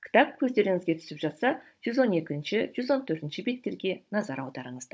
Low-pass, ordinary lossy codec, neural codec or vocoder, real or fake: none; none; codec, 16 kHz, 16 kbps, FunCodec, trained on Chinese and English, 50 frames a second; fake